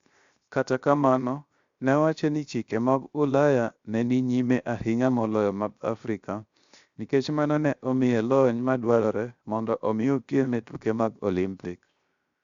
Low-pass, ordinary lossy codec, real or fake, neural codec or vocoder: 7.2 kHz; Opus, 64 kbps; fake; codec, 16 kHz, 0.7 kbps, FocalCodec